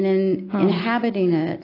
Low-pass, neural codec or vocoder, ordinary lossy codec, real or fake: 5.4 kHz; none; AAC, 24 kbps; real